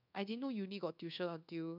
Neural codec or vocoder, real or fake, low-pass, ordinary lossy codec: codec, 24 kHz, 1.2 kbps, DualCodec; fake; 5.4 kHz; none